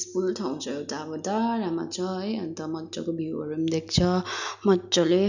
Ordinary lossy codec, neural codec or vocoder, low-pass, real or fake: none; none; 7.2 kHz; real